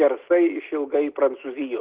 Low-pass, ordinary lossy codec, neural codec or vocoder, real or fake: 3.6 kHz; Opus, 16 kbps; none; real